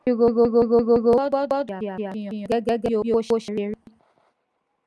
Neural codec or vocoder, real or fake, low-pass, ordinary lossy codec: none; real; none; none